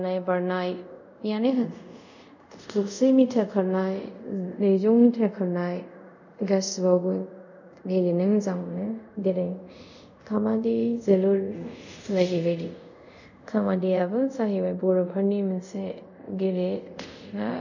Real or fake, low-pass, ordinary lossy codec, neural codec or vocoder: fake; 7.2 kHz; none; codec, 24 kHz, 0.5 kbps, DualCodec